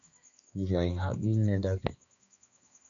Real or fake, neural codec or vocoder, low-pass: fake; codec, 16 kHz, 2 kbps, X-Codec, HuBERT features, trained on balanced general audio; 7.2 kHz